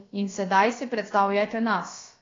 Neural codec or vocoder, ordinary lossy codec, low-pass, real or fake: codec, 16 kHz, about 1 kbps, DyCAST, with the encoder's durations; AAC, 32 kbps; 7.2 kHz; fake